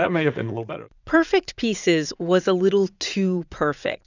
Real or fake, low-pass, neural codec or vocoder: fake; 7.2 kHz; vocoder, 44.1 kHz, 80 mel bands, Vocos